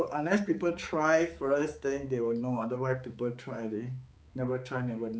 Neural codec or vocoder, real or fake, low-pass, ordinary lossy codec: codec, 16 kHz, 4 kbps, X-Codec, HuBERT features, trained on balanced general audio; fake; none; none